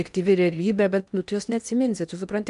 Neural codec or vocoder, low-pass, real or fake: codec, 16 kHz in and 24 kHz out, 0.8 kbps, FocalCodec, streaming, 65536 codes; 10.8 kHz; fake